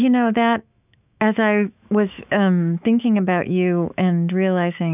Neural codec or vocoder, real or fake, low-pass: autoencoder, 48 kHz, 128 numbers a frame, DAC-VAE, trained on Japanese speech; fake; 3.6 kHz